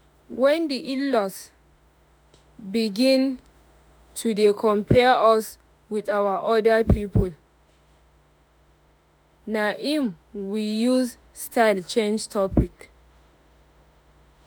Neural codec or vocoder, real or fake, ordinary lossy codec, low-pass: autoencoder, 48 kHz, 32 numbers a frame, DAC-VAE, trained on Japanese speech; fake; none; none